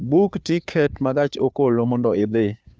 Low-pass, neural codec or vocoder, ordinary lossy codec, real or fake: none; codec, 16 kHz, 2 kbps, FunCodec, trained on Chinese and English, 25 frames a second; none; fake